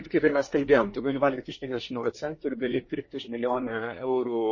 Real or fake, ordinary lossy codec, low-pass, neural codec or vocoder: fake; MP3, 32 kbps; 7.2 kHz; codec, 24 kHz, 1 kbps, SNAC